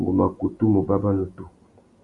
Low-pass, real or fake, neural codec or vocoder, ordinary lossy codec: 10.8 kHz; real; none; Opus, 64 kbps